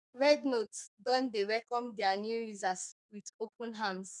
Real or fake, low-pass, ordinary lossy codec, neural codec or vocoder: fake; 10.8 kHz; none; codec, 32 kHz, 1.9 kbps, SNAC